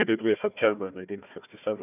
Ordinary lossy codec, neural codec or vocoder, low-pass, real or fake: none; codec, 16 kHz, 1 kbps, FunCodec, trained on Chinese and English, 50 frames a second; 3.6 kHz; fake